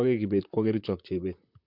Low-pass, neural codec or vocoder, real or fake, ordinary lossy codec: 5.4 kHz; vocoder, 44.1 kHz, 128 mel bands, Pupu-Vocoder; fake; MP3, 48 kbps